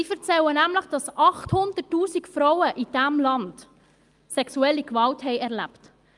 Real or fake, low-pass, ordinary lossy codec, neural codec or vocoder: real; 10.8 kHz; Opus, 32 kbps; none